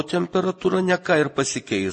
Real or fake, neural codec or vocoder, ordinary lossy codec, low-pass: real; none; MP3, 32 kbps; 9.9 kHz